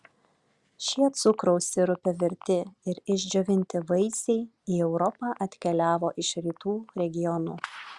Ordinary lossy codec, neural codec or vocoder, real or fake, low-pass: Opus, 64 kbps; none; real; 10.8 kHz